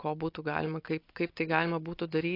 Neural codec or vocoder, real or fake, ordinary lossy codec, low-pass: none; real; AAC, 32 kbps; 5.4 kHz